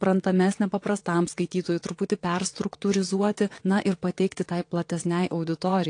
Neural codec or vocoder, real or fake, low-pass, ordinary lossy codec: vocoder, 22.05 kHz, 80 mel bands, WaveNeXt; fake; 9.9 kHz; AAC, 48 kbps